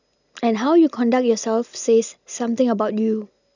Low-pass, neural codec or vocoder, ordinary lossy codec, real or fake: 7.2 kHz; none; none; real